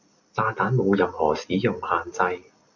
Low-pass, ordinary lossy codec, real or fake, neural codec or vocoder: 7.2 kHz; Opus, 64 kbps; real; none